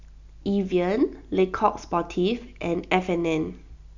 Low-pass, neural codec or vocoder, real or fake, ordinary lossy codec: 7.2 kHz; none; real; none